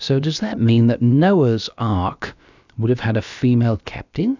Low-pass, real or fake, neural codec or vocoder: 7.2 kHz; fake; codec, 16 kHz, about 1 kbps, DyCAST, with the encoder's durations